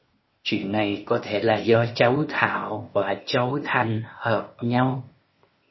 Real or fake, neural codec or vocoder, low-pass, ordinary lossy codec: fake; codec, 16 kHz, 0.8 kbps, ZipCodec; 7.2 kHz; MP3, 24 kbps